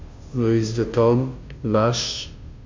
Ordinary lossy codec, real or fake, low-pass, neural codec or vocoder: MP3, 64 kbps; fake; 7.2 kHz; codec, 16 kHz, 0.5 kbps, FunCodec, trained on Chinese and English, 25 frames a second